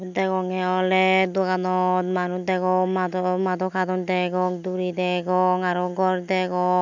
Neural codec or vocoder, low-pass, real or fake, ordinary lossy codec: none; 7.2 kHz; real; none